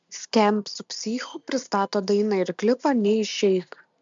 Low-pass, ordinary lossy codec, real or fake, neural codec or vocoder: 7.2 kHz; MP3, 64 kbps; real; none